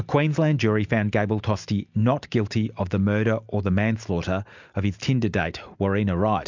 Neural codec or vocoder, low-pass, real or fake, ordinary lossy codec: none; 7.2 kHz; real; MP3, 64 kbps